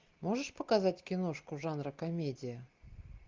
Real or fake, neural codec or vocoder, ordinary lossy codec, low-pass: real; none; Opus, 24 kbps; 7.2 kHz